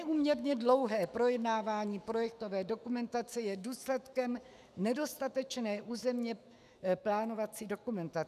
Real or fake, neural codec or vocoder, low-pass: fake; autoencoder, 48 kHz, 128 numbers a frame, DAC-VAE, trained on Japanese speech; 14.4 kHz